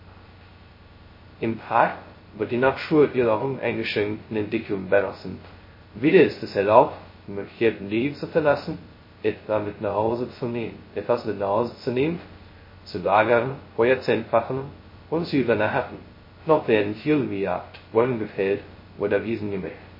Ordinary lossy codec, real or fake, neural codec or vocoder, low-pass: MP3, 24 kbps; fake; codec, 16 kHz, 0.2 kbps, FocalCodec; 5.4 kHz